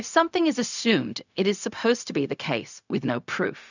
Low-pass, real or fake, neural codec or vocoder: 7.2 kHz; fake; codec, 16 kHz, 0.4 kbps, LongCat-Audio-Codec